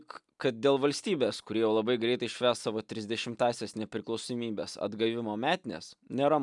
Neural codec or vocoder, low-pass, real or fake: none; 10.8 kHz; real